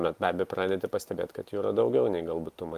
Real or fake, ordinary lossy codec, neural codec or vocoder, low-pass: real; Opus, 32 kbps; none; 14.4 kHz